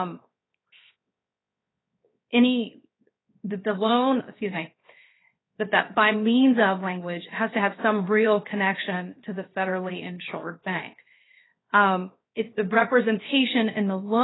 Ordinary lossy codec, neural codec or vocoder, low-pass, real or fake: AAC, 16 kbps; codec, 16 kHz, 0.7 kbps, FocalCodec; 7.2 kHz; fake